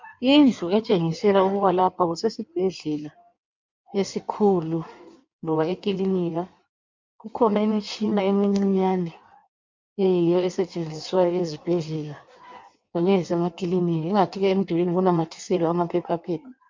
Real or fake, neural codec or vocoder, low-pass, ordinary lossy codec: fake; codec, 16 kHz in and 24 kHz out, 1.1 kbps, FireRedTTS-2 codec; 7.2 kHz; MP3, 64 kbps